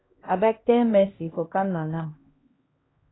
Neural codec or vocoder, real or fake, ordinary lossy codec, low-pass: codec, 16 kHz, 1 kbps, X-Codec, HuBERT features, trained on LibriSpeech; fake; AAC, 16 kbps; 7.2 kHz